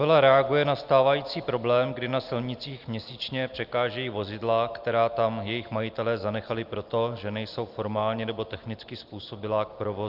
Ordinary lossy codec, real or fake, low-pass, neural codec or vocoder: Opus, 32 kbps; real; 5.4 kHz; none